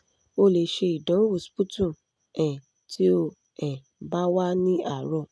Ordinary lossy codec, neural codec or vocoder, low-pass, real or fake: none; none; none; real